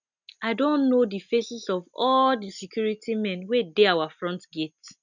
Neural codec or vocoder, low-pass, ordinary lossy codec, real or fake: none; 7.2 kHz; none; real